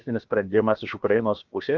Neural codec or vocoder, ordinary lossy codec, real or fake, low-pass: codec, 16 kHz, about 1 kbps, DyCAST, with the encoder's durations; Opus, 32 kbps; fake; 7.2 kHz